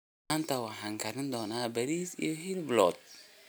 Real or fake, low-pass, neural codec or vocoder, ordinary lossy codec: real; none; none; none